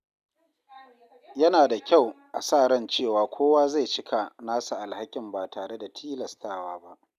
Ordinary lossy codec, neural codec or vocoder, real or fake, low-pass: none; none; real; 14.4 kHz